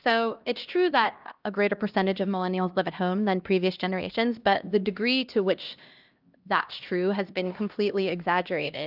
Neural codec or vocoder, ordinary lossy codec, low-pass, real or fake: codec, 16 kHz, 1 kbps, X-Codec, HuBERT features, trained on LibriSpeech; Opus, 24 kbps; 5.4 kHz; fake